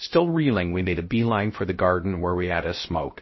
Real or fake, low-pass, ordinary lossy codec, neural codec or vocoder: fake; 7.2 kHz; MP3, 24 kbps; codec, 16 kHz, 0.3 kbps, FocalCodec